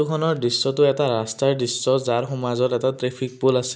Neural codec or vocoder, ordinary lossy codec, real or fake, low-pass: none; none; real; none